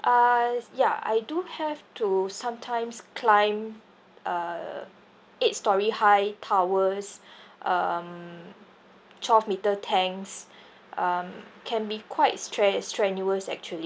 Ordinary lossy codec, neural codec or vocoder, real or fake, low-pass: none; none; real; none